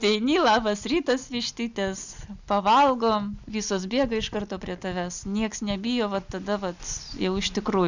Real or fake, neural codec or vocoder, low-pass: real; none; 7.2 kHz